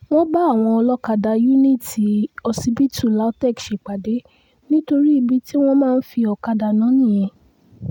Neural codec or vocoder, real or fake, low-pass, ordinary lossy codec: none; real; 19.8 kHz; none